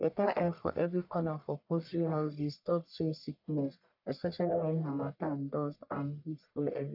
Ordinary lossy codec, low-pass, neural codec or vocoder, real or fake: none; 5.4 kHz; codec, 44.1 kHz, 1.7 kbps, Pupu-Codec; fake